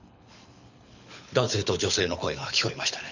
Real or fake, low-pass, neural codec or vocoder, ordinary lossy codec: fake; 7.2 kHz; codec, 24 kHz, 6 kbps, HILCodec; none